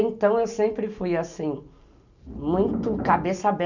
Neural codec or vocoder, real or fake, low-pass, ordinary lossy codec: none; real; 7.2 kHz; none